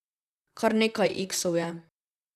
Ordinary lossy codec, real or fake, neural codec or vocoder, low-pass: none; fake; vocoder, 44.1 kHz, 128 mel bands, Pupu-Vocoder; 14.4 kHz